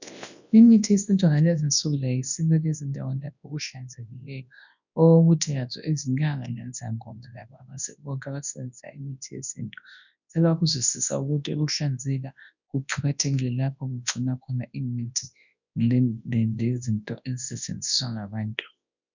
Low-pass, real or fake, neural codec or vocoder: 7.2 kHz; fake; codec, 24 kHz, 0.9 kbps, WavTokenizer, large speech release